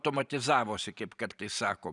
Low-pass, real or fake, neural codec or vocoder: 10.8 kHz; fake; vocoder, 44.1 kHz, 128 mel bands every 512 samples, BigVGAN v2